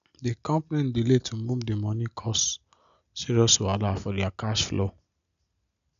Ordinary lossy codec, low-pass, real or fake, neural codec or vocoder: none; 7.2 kHz; real; none